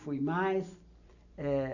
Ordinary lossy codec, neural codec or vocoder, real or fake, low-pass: none; none; real; 7.2 kHz